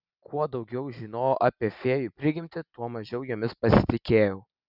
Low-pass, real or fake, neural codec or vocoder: 5.4 kHz; real; none